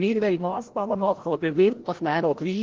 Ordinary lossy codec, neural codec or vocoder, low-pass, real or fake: Opus, 16 kbps; codec, 16 kHz, 0.5 kbps, FreqCodec, larger model; 7.2 kHz; fake